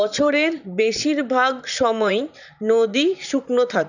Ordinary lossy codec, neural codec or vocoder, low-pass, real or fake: none; vocoder, 44.1 kHz, 128 mel bands, Pupu-Vocoder; 7.2 kHz; fake